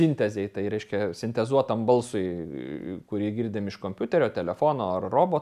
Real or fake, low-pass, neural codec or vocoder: real; 14.4 kHz; none